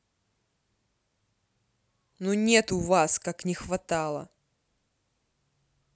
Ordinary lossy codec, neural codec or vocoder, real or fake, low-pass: none; none; real; none